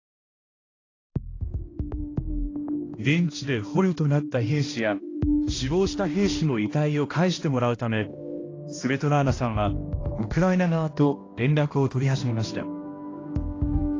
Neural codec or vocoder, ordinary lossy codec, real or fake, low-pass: codec, 16 kHz, 1 kbps, X-Codec, HuBERT features, trained on balanced general audio; AAC, 32 kbps; fake; 7.2 kHz